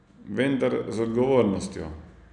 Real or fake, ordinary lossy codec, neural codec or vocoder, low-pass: real; none; none; 9.9 kHz